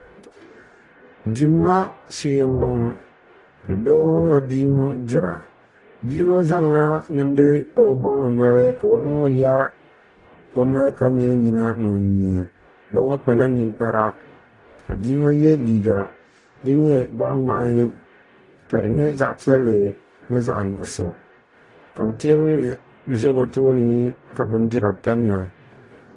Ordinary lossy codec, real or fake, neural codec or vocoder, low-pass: AAC, 48 kbps; fake; codec, 44.1 kHz, 0.9 kbps, DAC; 10.8 kHz